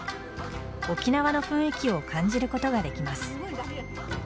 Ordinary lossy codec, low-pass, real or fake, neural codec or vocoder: none; none; real; none